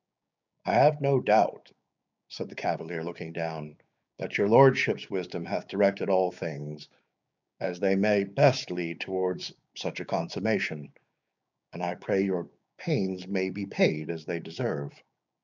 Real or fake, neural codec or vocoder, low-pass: fake; codec, 16 kHz, 6 kbps, DAC; 7.2 kHz